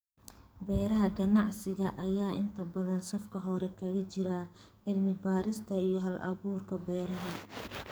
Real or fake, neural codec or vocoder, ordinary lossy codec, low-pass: fake; codec, 44.1 kHz, 2.6 kbps, SNAC; none; none